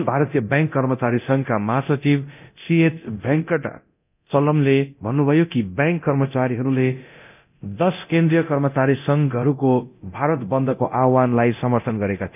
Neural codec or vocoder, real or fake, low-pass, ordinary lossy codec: codec, 24 kHz, 0.9 kbps, DualCodec; fake; 3.6 kHz; none